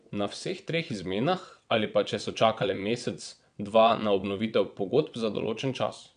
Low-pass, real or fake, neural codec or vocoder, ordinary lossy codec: 9.9 kHz; fake; vocoder, 22.05 kHz, 80 mel bands, WaveNeXt; none